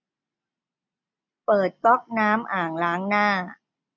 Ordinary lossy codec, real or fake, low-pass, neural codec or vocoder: none; real; 7.2 kHz; none